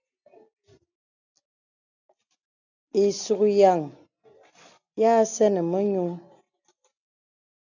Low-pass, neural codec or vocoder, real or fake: 7.2 kHz; none; real